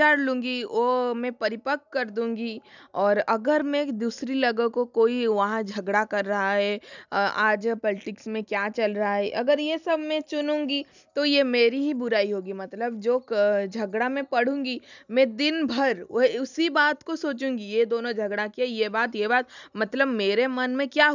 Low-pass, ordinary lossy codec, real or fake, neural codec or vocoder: 7.2 kHz; none; real; none